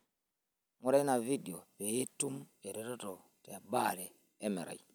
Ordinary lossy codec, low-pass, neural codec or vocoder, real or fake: none; none; none; real